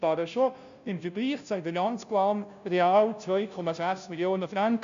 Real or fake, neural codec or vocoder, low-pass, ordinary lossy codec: fake; codec, 16 kHz, 0.5 kbps, FunCodec, trained on Chinese and English, 25 frames a second; 7.2 kHz; AAC, 96 kbps